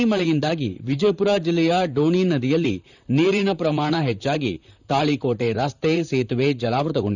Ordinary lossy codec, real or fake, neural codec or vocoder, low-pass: none; fake; vocoder, 44.1 kHz, 128 mel bands, Pupu-Vocoder; 7.2 kHz